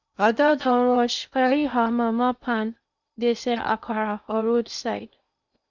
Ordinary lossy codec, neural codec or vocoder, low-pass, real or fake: none; codec, 16 kHz in and 24 kHz out, 0.6 kbps, FocalCodec, streaming, 2048 codes; 7.2 kHz; fake